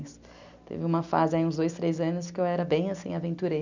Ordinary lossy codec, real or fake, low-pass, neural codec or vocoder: none; real; 7.2 kHz; none